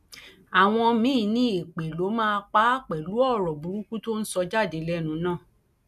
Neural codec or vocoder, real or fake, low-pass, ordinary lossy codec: none; real; 14.4 kHz; none